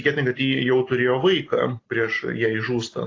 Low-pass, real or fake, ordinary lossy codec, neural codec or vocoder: 7.2 kHz; real; AAC, 32 kbps; none